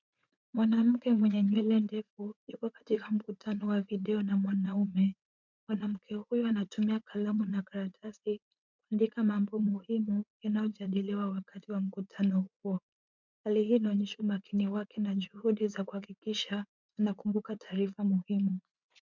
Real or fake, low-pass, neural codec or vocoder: fake; 7.2 kHz; vocoder, 44.1 kHz, 80 mel bands, Vocos